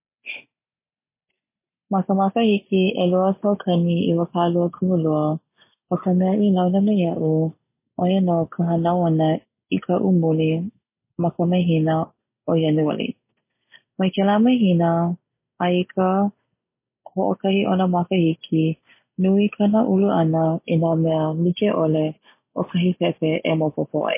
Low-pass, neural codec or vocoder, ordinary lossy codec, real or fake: 3.6 kHz; none; MP3, 24 kbps; real